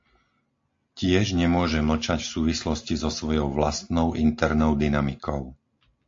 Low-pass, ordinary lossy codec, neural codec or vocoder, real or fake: 7.2 kHz; AAC, 48 kbps; none; real